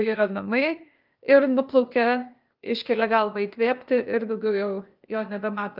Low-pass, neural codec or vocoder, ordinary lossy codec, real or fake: 5.4 kHz; codec, 16 kHz, 0.8 kbps, ZipCodec; Opus, 24 kbps; fake